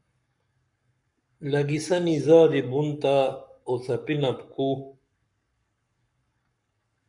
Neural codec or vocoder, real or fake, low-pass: codec, 44.1 kHz, 7.8 kbps, Pupu-Codec; fake; 10.8 kHz